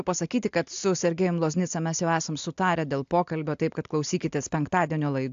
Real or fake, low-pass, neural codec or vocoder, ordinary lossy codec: real; 7.2 kHz; none; AAC, 64 kbps